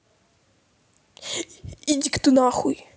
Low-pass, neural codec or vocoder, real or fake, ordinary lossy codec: none; none; real; none